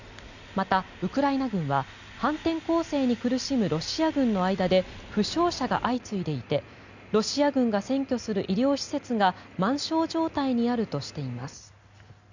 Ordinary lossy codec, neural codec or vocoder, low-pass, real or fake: none; none; 7.2 kHz; real